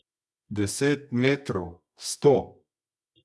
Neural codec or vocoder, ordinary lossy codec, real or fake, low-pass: codec, 24 kHz, 0.9 kbps, WavTokenizer, medium music audio release; none; fake; none